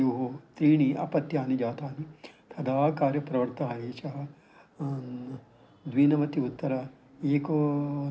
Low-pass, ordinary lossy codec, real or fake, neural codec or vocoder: none; none; real; none